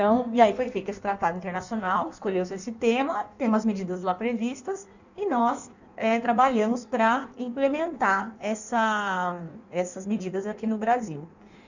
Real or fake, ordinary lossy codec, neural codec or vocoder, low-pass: fake; none; codec, 16 kHz in and 24 kHz out, 1.1 kbps, FireRedTTS-2 codec; 7.2 kHz